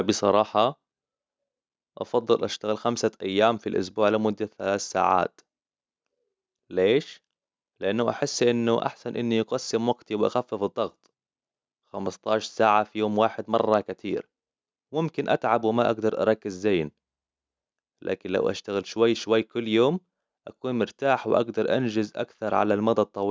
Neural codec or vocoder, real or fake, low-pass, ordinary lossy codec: none; real; none; none